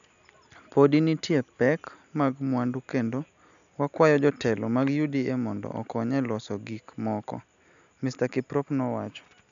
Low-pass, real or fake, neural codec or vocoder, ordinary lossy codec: 7.2 kHz; real; none; none